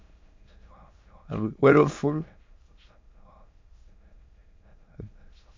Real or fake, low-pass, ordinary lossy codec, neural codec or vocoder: fake; 7.2 kHz; MP3, 64 kbps; autoencoder, 22.05 kHz, a latent of 192 numbers a frame, VITS, trained on many speakers